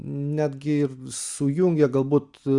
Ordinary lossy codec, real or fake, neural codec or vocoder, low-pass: Opus, 64 kbps; real; none; 10.8 kHz